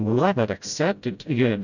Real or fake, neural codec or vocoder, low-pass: fake; codec, 16 kHz, 0.5 kbps, FreqCodec, smaller model; 7.2 kHz